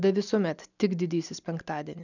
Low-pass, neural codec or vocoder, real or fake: 7.2 kHz; none; real